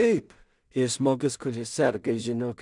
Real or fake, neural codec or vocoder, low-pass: fake; codec, 16 kHz in and 24 kHz out, 0.4 kbps, LongCat-Audio-Codec, two codebook decoder; 10.8 kHz